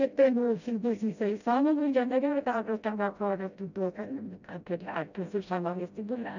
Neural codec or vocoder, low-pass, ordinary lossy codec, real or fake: codec, 16 kHz, 0.5 kbps, FreqCodec, smaller model; 7.2 kHz; none; fake